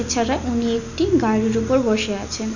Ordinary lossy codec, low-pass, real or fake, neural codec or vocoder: none; 7.2 kHz; real; none